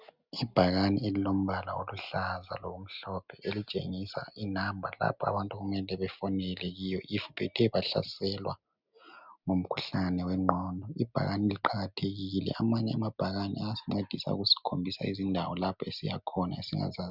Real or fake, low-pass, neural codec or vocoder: real; 5.4 kHz; none